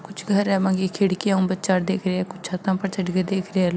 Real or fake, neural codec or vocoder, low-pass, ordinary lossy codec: real; none; none; none